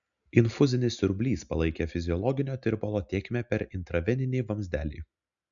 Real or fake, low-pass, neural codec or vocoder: real; 7.2 kHz; none